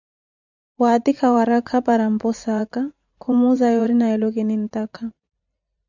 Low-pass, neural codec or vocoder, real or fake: 7.2 kHz; vocoder, 44.1 kHz, 128 mel bands every 512 samples, BigVGAN v2; fake